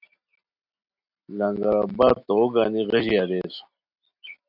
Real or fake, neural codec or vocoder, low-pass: real; none; 5.4 kHz